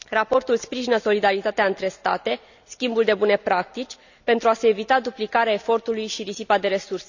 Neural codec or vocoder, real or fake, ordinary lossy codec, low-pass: none; real; none; 7.2 kHz